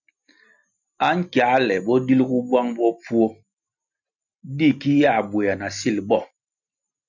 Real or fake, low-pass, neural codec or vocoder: real; 7.2 kHz; none